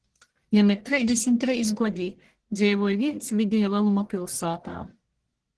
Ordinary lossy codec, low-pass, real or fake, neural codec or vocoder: Opus, 16 kbps; 10.8 kHz; fake; codec, 44.1 kHz, 1.7 kbps, Pupu-Codec